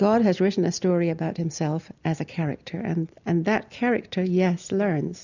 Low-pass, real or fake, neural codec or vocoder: 7.2 kHz; real; none